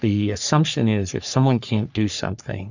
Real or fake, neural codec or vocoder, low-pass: fake; codec, 44.1 kHz, 3.4 kbps, Pupu-Codec; 7.2 kHz